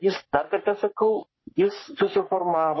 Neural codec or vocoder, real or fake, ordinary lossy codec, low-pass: codec, 44.1 kHz, 3.4 kbps, Pupu-Codec; fake; MP3, 24 kbps; 7.2 kHz